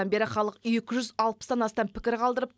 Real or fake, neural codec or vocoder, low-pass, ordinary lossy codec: real; none; none; none